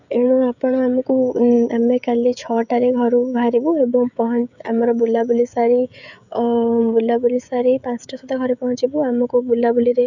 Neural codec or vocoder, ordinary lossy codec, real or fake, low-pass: codec, 16 kHz, 16 kbps, FreqCodec, smaller model; none; fake; 7.2 kHz